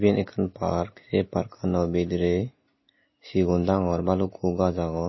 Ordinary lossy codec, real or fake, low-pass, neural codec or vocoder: MP3, 24 kbps; real; 7.2 kHz; none